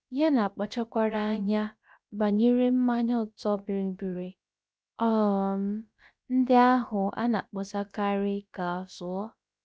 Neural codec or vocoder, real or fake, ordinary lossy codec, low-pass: codec, 16 kHz, about 1 kbps, DyCAST, with the encoder's durations; fake; none; none